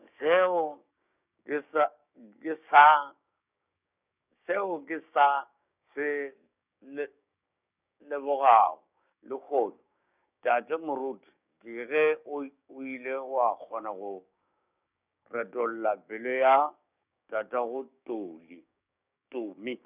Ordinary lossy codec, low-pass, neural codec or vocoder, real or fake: none; 3.6 kHz; codec, 16 kHz, 6 kbps, DAC; fake